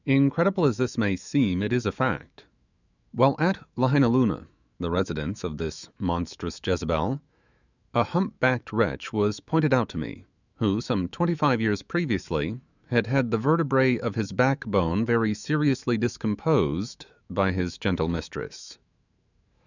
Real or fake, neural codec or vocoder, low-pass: fake; autoencoder, 48 kHz, 128 numbers a frame, DAC-VAE, trained on Japanese speech; 7.2 kHz